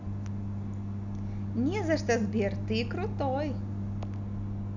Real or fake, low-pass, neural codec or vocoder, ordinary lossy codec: real; 7.2 kHz; none; none